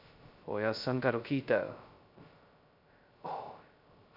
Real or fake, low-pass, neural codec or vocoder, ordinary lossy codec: fake; 5.4 kHz; codec, 16 kHz, 0.2 kbps, FocalCodec; AAC, 48 kbps